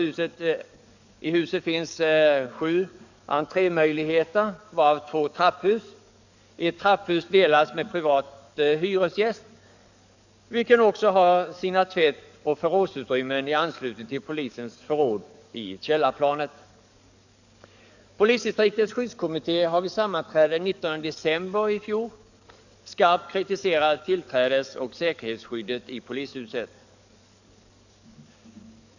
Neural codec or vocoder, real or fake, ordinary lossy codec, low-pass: codec, 44.1 kHz, 7.8 kbps, DAC; fake; none; 7.2 kHz